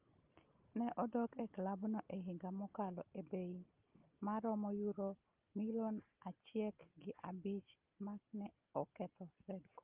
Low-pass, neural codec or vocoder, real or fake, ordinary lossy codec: 3.6 kHz; none; real; Opus, 24 kbps